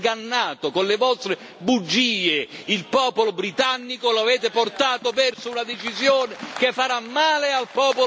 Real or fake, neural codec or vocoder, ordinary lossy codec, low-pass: real; none; none; none